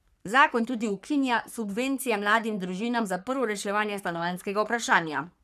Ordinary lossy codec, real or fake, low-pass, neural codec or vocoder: none; fake; 14.4 kHz; codec, 44.1 kHz, 3.4 kbps, Pupu-Codec